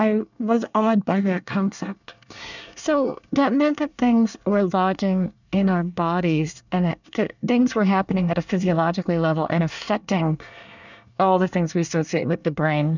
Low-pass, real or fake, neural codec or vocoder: 7.2 kHz; fake; codec, 24 kHz, 1 kbps, SNAC